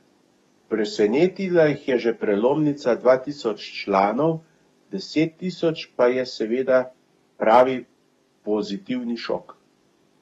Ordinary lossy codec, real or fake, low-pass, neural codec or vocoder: AAC, 32 kbps; fake; 19.8 kHz; codec, 44.1 kHz, 7.8 kbps, DAC